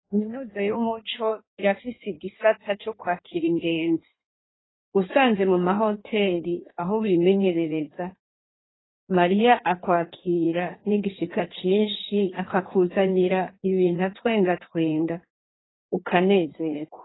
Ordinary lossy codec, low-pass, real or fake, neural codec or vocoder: AAC, 16 kbps; 7.2 kHz; fake; codec, 16 kHz in and 24 kHz out, 1.1 kbps, FireRedTTS-2 codec